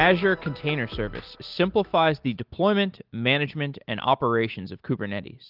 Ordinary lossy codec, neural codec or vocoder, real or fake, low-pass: Opus, 32 kbps; none; real; 5.4 kHz